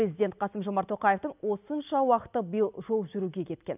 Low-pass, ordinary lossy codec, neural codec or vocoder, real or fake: 3.6 kHz; none; none; real